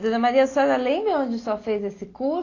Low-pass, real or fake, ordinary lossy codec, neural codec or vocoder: 7.2 kHz; real; AAC, 32 kbps; none